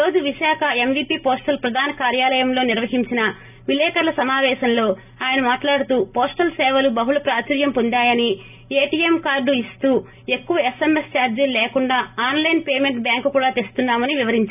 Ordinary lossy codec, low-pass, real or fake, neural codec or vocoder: none; 3.6 kHz; real; none